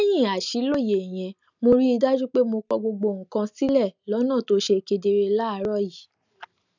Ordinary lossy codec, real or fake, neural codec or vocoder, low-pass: none; real; none; 7.2 kHz